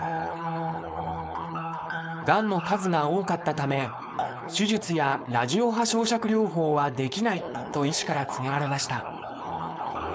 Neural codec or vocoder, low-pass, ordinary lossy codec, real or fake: codec, 16 kHz, 4.8 kbps, FACodec; none; none; fake